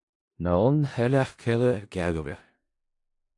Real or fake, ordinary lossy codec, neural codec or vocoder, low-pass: fake; AAC, 48 kbps; codec, 16 kHz in and 24 kHz out, 0.4 kbps, LongCat-Audio-Codec, four codebook decoder; 10.8 kHz